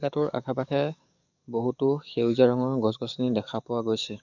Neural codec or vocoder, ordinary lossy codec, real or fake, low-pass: codec, 44.1 kHz, 7.8 kbps, DAC; none; fake; 7.2 kHz